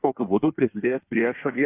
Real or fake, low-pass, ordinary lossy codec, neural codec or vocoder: fake; 3.6 kHz; AAC, 24 kbps; codec, 16 kHz in and 24 kHz out, 1.1 kbps, FireRedTTS-2 codec